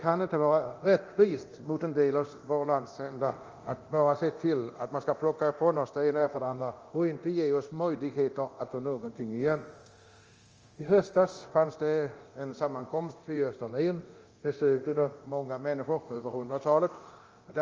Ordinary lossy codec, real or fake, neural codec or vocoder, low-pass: Opus, 24 kbps; fake; codec, 24 kHz, 0.9 kbps, DualCodec; 7.2 kHz